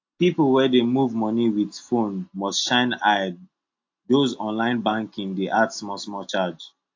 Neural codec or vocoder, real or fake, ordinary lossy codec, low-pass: none; real; AAC, 48 kbps; 7.2 kHz